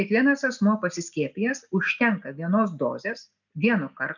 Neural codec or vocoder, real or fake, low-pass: none; real; 7.2 kHz